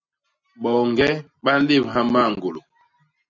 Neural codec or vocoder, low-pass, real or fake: none; 7.2 kHz; real